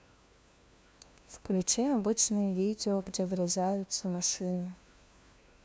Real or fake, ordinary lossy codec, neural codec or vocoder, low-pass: fake; none; codec, 16 kHz, 1 kbps, FunCodec, trained on LibriTTS, 50 frames a second; none